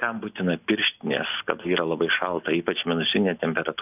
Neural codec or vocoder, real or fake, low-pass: none; real; 3.6 kHz